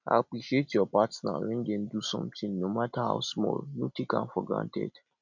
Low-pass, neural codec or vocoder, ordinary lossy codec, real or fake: 7.2 kHz; none; none; real